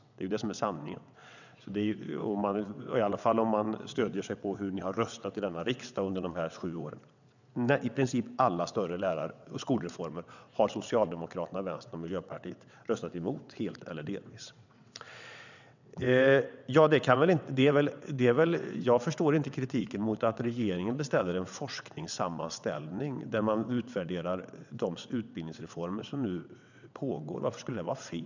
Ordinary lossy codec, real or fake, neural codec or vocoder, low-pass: none; fake; vocoder, 22.05 kHz, 80 mel bands, WaveNeXt; 7.2 kHz